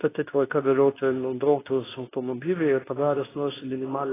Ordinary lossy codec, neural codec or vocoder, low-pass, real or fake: AAC, 16 kbps; codec, 24 kHz, 0.9 kbps, WavTokenizer, medium speech release version 2; 3.6 kHz; fake